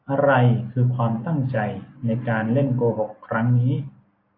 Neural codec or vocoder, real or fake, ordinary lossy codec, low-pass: none; real; AAC, 32 kbps; 5.4 kHz